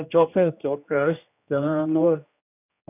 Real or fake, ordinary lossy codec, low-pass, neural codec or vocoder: fake; none; 3.6 kHz; codec, 16 kHz, 1 kbps, X-Codec, HuBERT features, trained on general audio